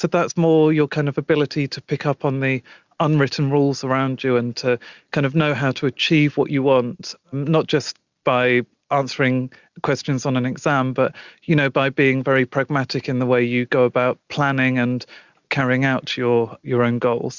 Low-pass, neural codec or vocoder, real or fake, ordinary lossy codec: 7.2 kHz; none; real; Opus, 64 kbps